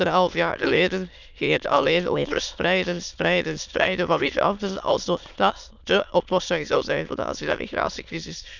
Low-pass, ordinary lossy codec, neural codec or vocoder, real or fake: 7.2 kHz; none; autoencoder, 22.05 kHz, a latent of 192 numbers a frame, VITS, trained on many speakers; fake